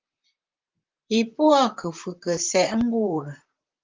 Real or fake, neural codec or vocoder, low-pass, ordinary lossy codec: fake; vocoder, 44.1 kHz, 128 mel bands, Pupu-Vocoder; 7.2 kHz; Opus, 24 kbps